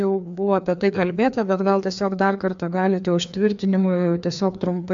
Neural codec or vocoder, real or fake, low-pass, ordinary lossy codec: codec, 16 kHz, 2 kbps, FreqCodec, larger model; fake; 7.2 kHz; MP3, 64 kbps